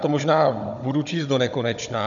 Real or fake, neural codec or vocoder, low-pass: fake; codec, 16 kHz, 16 kbps, FunCodec, trained on Chinese and English, 50 frames a second; 7.2 kHz